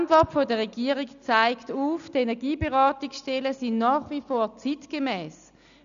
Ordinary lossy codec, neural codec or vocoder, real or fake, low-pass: none; none; real; 7.2 kHz